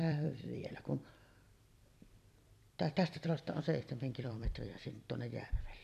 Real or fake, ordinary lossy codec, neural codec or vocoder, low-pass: fake; none; vocoder, 44.1 kHz, 128 mel bands every 256 samples, BigVGAN v2; 14.4 kHz